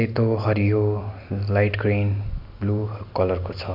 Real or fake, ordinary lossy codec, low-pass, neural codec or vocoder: real; MP3, 48 kbps; 5.4 kHz; none